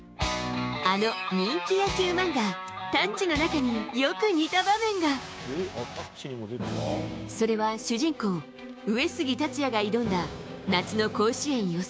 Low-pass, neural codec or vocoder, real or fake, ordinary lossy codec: none; codec, 16 kHz, 6 kbps, DAC; fake; none